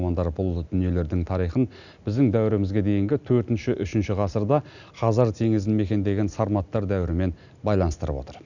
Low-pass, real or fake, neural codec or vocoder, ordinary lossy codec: 7.2 kHz; real; none; none